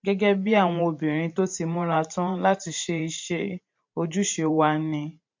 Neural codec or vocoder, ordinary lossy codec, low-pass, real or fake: vocoder, 44.1 kHz, 128 mel bands every 512 samples, BigVGAN v2; MP3, 48 kbps; 7.2 kHz; fake